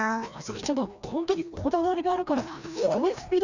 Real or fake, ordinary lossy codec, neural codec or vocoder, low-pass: fake; none; codec, 16 kHz, 1 kbps, FreqCodec, larger model; 7.2 kHz